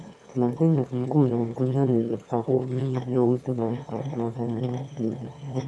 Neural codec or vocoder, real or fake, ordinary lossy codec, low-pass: autoencoder, 22.05 kHz, a latent of 192 numbers a frame, VITS, trained on one speaker; fake; none; none